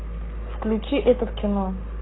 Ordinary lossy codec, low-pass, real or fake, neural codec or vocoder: AAC, 16 kbps; 7.2 kHz; fake; codec, 44.1 kHz, 7.8 kbps, Pupu-Codec